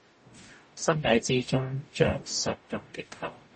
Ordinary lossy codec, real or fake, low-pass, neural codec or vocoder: MP3, 32 kbps; fake; 10.8 kHz; codec, 44.1 kHz, 0.9 kbps, DAC